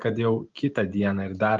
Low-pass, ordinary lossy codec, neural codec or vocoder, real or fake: 7.2 kHz; Opus, 24 kbps; none; real